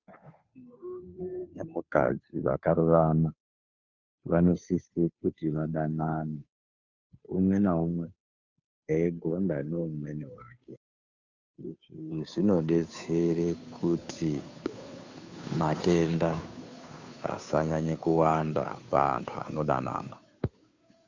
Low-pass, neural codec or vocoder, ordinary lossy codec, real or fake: 7.2 kHz; codec, 16 kHz, 2 kbps, FunCodec, trained on Chinese and English, 25 frames a second; Opus, 64 kbps; fake